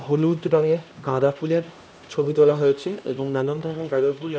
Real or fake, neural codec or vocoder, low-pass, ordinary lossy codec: fake; codec, 16 kHz, 1 kbps, X-Codec, HuBERT features, trained on LibriSpeech; none; none